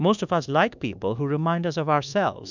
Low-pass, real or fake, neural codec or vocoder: 7.2 kHz; fake; codec, 24 kHz, 1.2 kbps, DualCodec